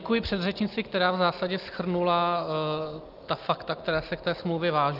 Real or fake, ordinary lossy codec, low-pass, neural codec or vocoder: real; Opus, 32 kbps; 5.4 kHz; none